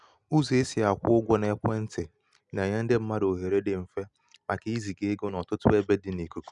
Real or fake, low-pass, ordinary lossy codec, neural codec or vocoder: real; 10.8 kHz; none; none